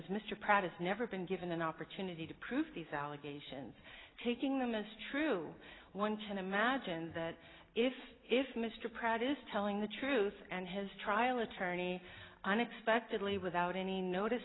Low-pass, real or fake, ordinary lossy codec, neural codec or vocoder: 7.2 kHz; real; AAC, 16 kbps; none